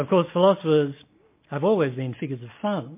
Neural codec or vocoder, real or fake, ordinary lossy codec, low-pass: none; real; MP3, 24 kbps; 3.6 kHz